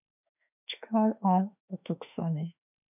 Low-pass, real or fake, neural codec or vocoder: 3.6 kHz; fake; autoencoder, 48 kHz, 32 numbers a frame, DAC-VAE, trained on Japanese speech